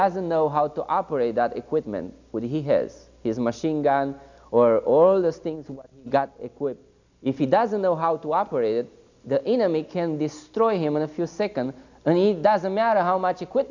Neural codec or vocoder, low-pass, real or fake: none; 7.2 kHz; real